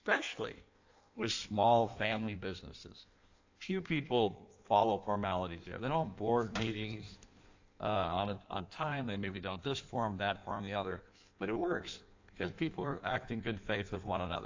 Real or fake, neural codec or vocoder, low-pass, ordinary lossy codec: fake; codec, 16 kHz in and 24 kHz out, 1.1 kbps, FireRedTTS-2 codec; 7.2 kHz; AAC, 48 kbps